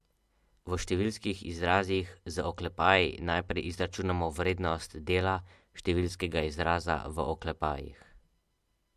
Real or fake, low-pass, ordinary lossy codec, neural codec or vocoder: real; 14.4 kHz; MP3, 64 kbps; none